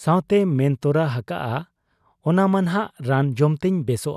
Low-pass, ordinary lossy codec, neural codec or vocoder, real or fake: 14.4 kHz; none; none; real